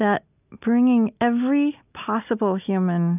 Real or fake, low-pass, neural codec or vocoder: real; 3.6 kHz; none